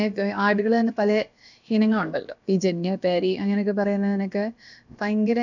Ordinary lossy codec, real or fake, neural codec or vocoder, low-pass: none; fake; codec, 16 kHz, about 1 kbps, DyCAST, with the encoder's durations; 7.2 kHz